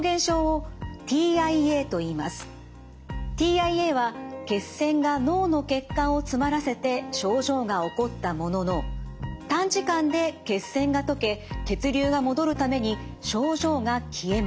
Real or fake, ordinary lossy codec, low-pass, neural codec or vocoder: real; none; none; none